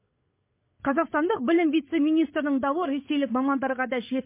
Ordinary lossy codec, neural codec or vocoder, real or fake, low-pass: MP3, 24 kbps; codec, 16 kHz, 8 kbps, FreqCodec, larger model; fake; 3.6 kHz